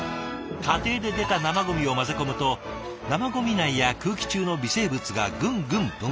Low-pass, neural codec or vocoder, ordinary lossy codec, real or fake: none; none; none; real